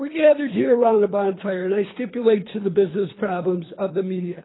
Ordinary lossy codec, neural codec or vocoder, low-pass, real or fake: AAC, 16 kbps; codec, 24 kHz, 3 kbps, HILCodec; 7.2 kHz; fake